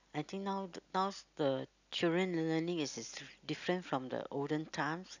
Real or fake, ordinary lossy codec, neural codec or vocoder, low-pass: real; none; none; 7.2 kHz